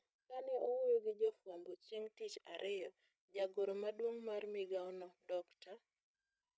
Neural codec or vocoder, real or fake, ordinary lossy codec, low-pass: codec, 16 kHz, 16 kbps, FreqCodec, larger model; fake; none; none